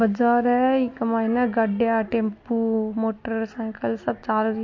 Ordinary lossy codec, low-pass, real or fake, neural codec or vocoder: MP3, 48 kbps; 7.2 kHz; real; none